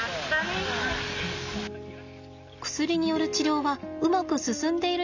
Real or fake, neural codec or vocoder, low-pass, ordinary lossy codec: real; none; 7.2 kHz; none